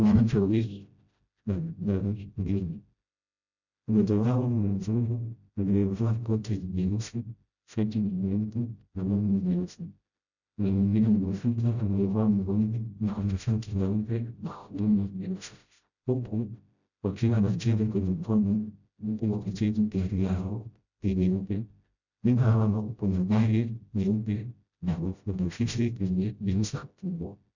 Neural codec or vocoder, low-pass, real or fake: codec, 16 kHz, 0.5 kbps, FreqCodec, smaller model; 7.2 kHz; fake